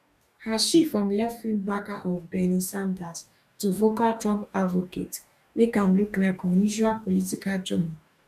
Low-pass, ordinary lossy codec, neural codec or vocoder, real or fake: 14.4 kHz; none; codec, 44.1 kHz, 2.6 kbps, DAC; fake